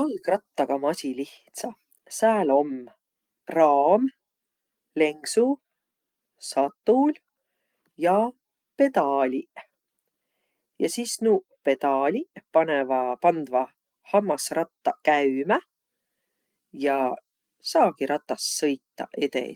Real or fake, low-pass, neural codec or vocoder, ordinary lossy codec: real; 19.8 kHz; none; Opus, 24 kbps